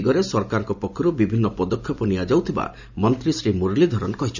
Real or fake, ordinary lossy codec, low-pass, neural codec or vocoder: real; none; 7.2 kHz; none